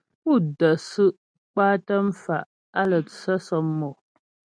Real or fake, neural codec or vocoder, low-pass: real; none; 9.9 kHz